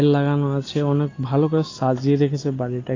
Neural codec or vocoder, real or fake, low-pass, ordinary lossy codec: none; real; 7.2 kHz; AAC, 32 kbps